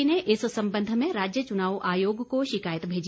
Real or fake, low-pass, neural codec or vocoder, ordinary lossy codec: real; none; none; none